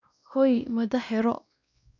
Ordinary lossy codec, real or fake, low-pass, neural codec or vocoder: none; fake; 7.2 kHz; codec, 16 kHz, 1 kbps, X-Codec, WavLM features, trained on Multilingual LibriSpeech